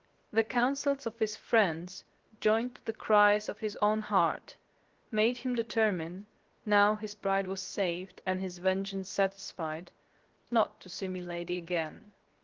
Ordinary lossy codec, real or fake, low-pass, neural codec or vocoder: Opus, 16 kbps; fake; 7.2 kHz; codec, 16 kHz, 0.7 kbps, FocalCodec